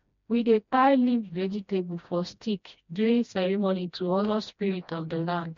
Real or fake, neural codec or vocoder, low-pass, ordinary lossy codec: fake; codec, 16 kHz, 1 kbps, FreqCodec, smaller model; 7.2 kHz; MP3, 48 kbps